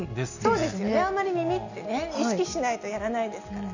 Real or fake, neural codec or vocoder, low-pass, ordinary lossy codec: real; none; 7.2 kHz; none